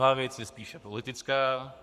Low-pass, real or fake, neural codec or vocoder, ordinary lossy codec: 14.4 kHz; fake; codec, 44.1 kHz, 7.8 kbps, Pupu-Codec; Opus, 64 kbps